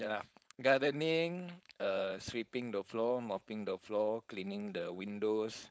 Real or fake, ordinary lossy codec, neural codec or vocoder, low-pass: fake; none; codec, 16 kHz, 4.8 kbps, FACodec; none